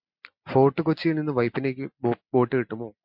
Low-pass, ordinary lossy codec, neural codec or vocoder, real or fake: 5.4 kHz; MP3, 48 kbps; none; real